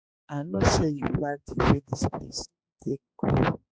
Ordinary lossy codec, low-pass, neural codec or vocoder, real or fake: none; none; codec, 16 kHz, 2 kbps, X-Codec, HuBERT features, trained on balanced general audio; fake